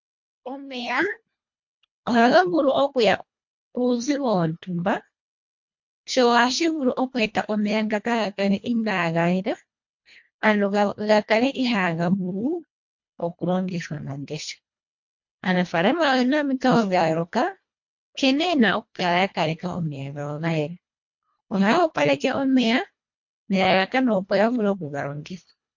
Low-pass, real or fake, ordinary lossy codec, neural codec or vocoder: 7.2 kHz; fake; MP3, 48 kbps; codec, 24 kHz, 1.5 kbps, HILCodec